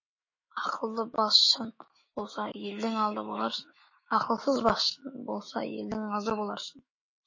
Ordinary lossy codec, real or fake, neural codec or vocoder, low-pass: MP3, 32 kbps; fake; autoencoder, 48 kHz, 128 numbers a frame, DAC-VAE, trained on Japanese speech; 7.2 kHz